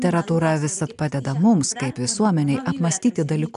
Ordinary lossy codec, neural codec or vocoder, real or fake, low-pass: AAC, 96 kbps; none; real; 10.8 kHz